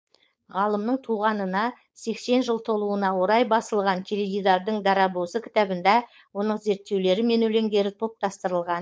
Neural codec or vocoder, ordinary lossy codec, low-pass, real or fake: codec, 16 kHz, 4.8 kbps, FACodec; none; none; fake